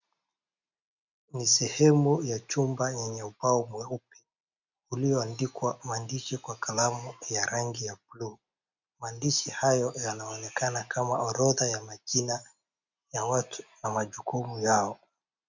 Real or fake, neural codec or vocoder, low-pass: real; none; 7.2 kHz